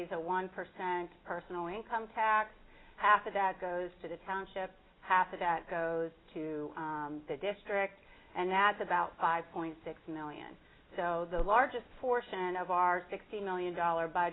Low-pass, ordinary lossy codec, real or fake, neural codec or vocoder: 7.2 kHz; AAC, 16 kbps; real; none